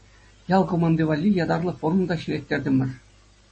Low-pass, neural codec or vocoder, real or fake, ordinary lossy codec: 9.9 kHz; none; real; MP3, 32 kbps